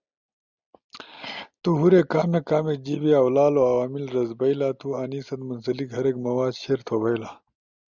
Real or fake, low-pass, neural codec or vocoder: real; 7.2 kHz; none